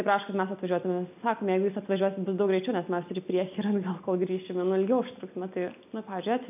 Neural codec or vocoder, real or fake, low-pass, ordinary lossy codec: none; real; 3.6 kHz; AAC, 32 kbps